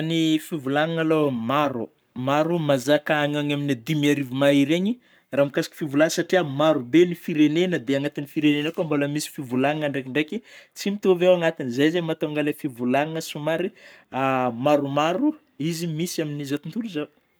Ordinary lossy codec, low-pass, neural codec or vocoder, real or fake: none; none; codec, 44.1 kHz, 7.8 kbps, Pupu-Codec; fake